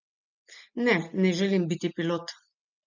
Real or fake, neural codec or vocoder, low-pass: real; none; 7.2 kHz